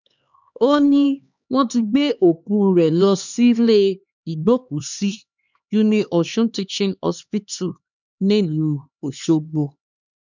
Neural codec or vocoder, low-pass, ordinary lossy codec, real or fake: codec, 16 kHz, 2 kbps, X-Codec, HuBERT features, trained on LibriSpeech; 7.2 kHz; none; fake